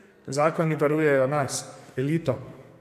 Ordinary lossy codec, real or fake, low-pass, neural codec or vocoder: none; fake; 14.4 kHz; codec, 44.1 kHz, 2.6 kbps, SNAC